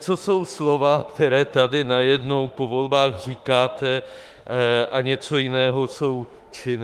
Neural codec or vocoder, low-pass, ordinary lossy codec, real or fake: autoencoder, 48 kHz, 32 numbers a frame, DAC-VAE, trained on Japanese speech; 14.4 kHz; Opus, 32 kbps; fake